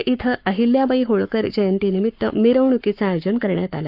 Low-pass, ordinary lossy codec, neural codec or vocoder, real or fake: 5.4 kHz; Opus, 24 kbps; codec, 44.1 kHz, 7.8 kbps, Pupu-Codec; fake